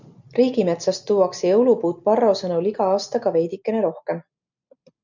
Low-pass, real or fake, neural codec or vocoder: 7.2 kHz; real; none